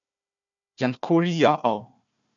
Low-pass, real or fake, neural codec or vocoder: 7.2 kHz; fake; codec, 16 kHz, 1 kbps, FunCodec, trained on Chinese and English, 50 frames a second